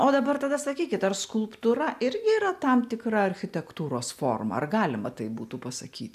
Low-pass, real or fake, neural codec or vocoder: 14.4 kHz; real; none